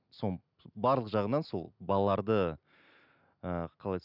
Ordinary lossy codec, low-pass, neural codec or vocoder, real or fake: none; 5.4 kHz; none; real